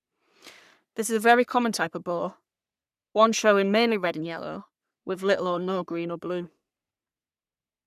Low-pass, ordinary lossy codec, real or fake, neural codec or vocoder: 14.4 kHz; none; fake; codec, 44.1 kHz, 3.4 kbps, Pupu-Codec